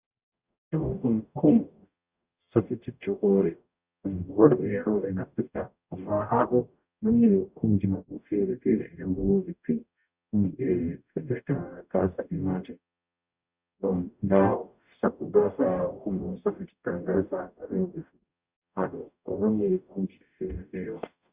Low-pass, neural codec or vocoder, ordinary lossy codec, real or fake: 3.6 kHz; codec, 44.1 kHz, 0.9 kbps, DAC; Opus, 64 kbps; fake